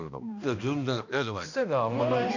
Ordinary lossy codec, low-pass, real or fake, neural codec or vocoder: none; 7.2 kHz; fake; codec, 16 kHz, 1 kbps, X-Codec, HuBERT features, trained on balanced general audio